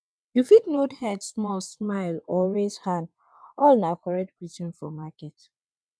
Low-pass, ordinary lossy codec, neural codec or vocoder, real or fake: none; none; vocoder, 22.05 kHz, 80 mel bands, WaveNeXt; fake